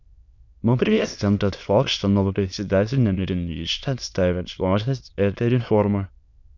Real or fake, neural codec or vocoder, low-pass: fake; autoencoder, 22.05 kHz, a latent of 192 numbers a frame, VITS, trained on many speakers; 7.2 kHz